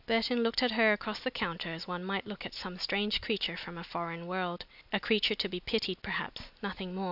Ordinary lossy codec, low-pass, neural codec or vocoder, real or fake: AAC, 48 kbps; 5.4 kHz; none; real